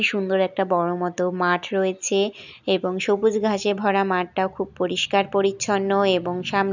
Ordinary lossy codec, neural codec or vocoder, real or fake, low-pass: none; none; real; 7.2 kHz